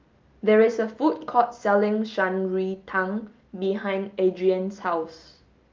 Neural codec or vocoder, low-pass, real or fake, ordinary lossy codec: none; 7.2 kHz; real; Opus, 24 kbps